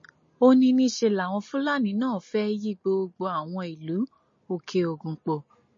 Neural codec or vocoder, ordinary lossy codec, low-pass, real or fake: none; MP3, 32 kbps; 7.2 kHz; real